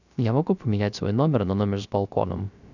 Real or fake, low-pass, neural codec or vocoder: fake; 7.2 kHz; codec, 16 kHz, 0.3 kbps, FocalCodec